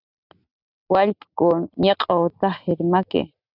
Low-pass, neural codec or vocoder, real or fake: 5.4 kHz; none; real